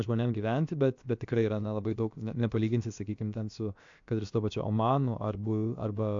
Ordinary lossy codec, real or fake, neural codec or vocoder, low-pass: AAC, 64 kbps; fake; codec, 16 kHz, about 1 kbps, DyCAST, with the encoder's durations; 7.2 kHz